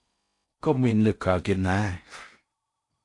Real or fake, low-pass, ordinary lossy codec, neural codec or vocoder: fake; 10.8 kHz; AAC, 48 kbps; codec, 16 kHz in and 24 kHz out, 0.6 kbps, FocalCodec, streaming, 4096 codes